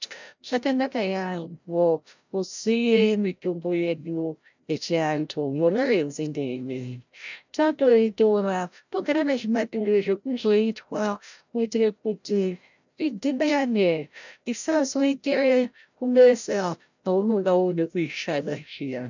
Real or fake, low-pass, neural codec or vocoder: fake; 7.2 kHz; codec, 16 kHz, 0.5 kbps, FreqCodec, larger model